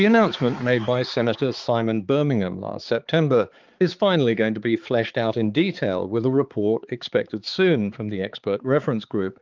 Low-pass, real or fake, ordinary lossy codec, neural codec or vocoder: 7.2 kHz; fake; Opus, 32 kbps; codec, 16 kHz, 4 kbps, X-Codec, HuBERT features, trained on balanced general audio